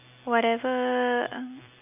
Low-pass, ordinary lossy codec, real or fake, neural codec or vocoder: 3.6 kHz; none; real; none